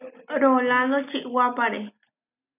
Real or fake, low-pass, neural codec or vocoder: real; 3.6 kHz; none